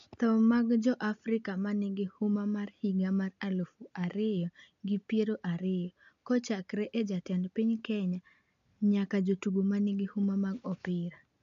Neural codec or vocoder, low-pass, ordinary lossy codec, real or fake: none; 7.2 kHz; AAC, 96 kbps; real